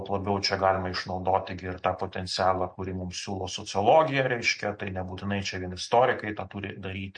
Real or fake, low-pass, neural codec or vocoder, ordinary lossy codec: real; 9.9 kHz; none; MP3, 48 kbps